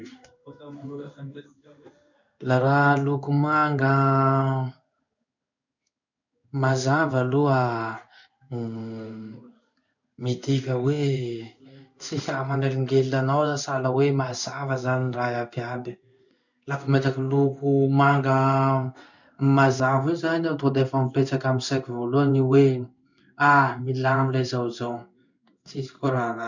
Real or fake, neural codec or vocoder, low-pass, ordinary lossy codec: fake; codec, 16 kHz in and 24 kHz out, 1 kbps, XY-Tokenizer; 7.2 kHz; none